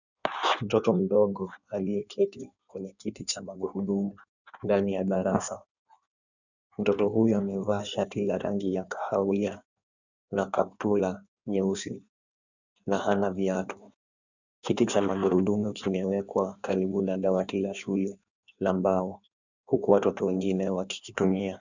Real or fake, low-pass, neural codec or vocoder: fake; 7.2 kHz; codec, 16 kHz in and 24 kHz out, 1.1 kbps, FireRedTTS-2 codec